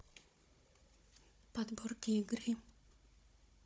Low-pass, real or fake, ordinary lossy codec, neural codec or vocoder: none; fake; none; codec, 16 kHz, 16 kbps, FunCodec, trained on Chinese and English, 50 frames a second